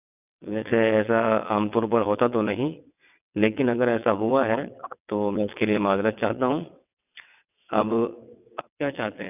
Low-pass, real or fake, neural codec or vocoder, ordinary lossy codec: 3.6 kHz; fake; vocoder, 22.05 kHz, 80 mel bands, WaveNeXt; none